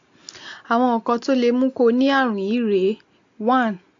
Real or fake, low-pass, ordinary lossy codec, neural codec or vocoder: real; 7.2 kHz; AAC, 48 kbps; none